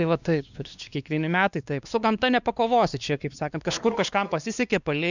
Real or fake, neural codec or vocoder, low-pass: fake; codec, 16 kHz, 2 kbps, X-Codec, WavLM features, trained on Multilingual LibriSpeech; 7.2 kHz